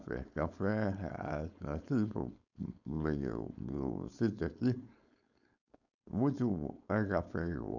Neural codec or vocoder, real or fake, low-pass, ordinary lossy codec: codec, 16 kHz, 4.8 kbps, FACodec; fake; 7.2 kHz; none